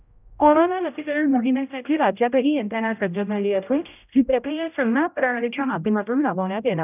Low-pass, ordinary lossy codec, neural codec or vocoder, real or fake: 3.6 kHz; none; codec, 16 kHz, 0.5 kbps, X-Codec, HuBERT features, trained on general audio; fake